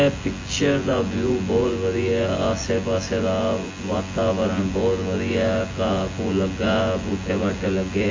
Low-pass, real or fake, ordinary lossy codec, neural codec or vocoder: 7.2 kHz; fake; MP3, 32 kbps; vocoder, 24 kHz, 100 mel bands, Vocos